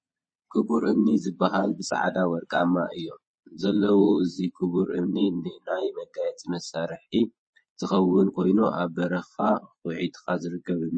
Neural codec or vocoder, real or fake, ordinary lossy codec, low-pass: vocoder, 22.05 kHz, 80 mel bands, Vocos; fake; MP3, 32 kbps; 9.9 kHz